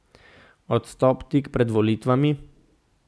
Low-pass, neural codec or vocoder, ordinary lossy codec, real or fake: none; none; none; real